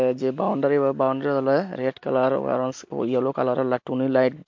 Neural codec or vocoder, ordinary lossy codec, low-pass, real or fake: none; MP3, 48 kbps; 7.2 kHz; real